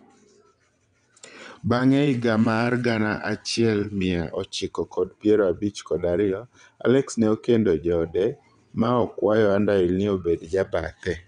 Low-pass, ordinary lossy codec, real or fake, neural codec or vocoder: 9.9 kHz; none; fake; vocoder, 22.05 kHz, 80 mel bands, WaveNeXt